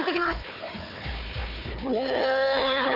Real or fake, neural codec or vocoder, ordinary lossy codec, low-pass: fake; codec, 16 kHz, 4 kbps, FunCodec, trained on Chinese and English, 50 frames a second; none; 5.4 kHz